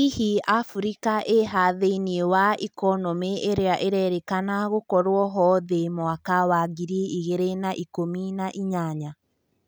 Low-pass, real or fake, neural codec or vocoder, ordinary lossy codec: none; real; none; none